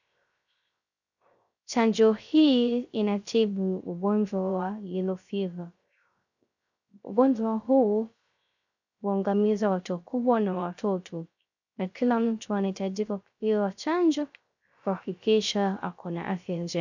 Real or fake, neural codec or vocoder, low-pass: fake; codec, 16 kHz, 0.3 kbps, FocalCodec; 7.2 kHz